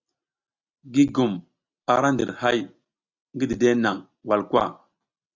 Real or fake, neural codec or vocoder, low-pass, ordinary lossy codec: real; none; 7.2 kHz; Opus, 64 kbps